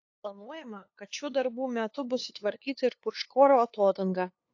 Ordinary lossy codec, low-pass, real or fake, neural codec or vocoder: AAC, 48 kbps; 7.2 kHz; fake; codec, 16 kHz, 2 kbps, FunCodec, trained on LibriTTS, 25 frames a second